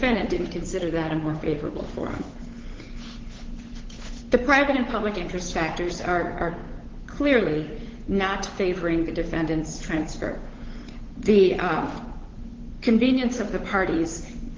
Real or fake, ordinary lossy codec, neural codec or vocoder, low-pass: fake; Opus, 16 kbps; codec, 16 kHz, 8 kbps, FunCodec, trained on Chinese and English, 25 frames a second; 7.2 kHz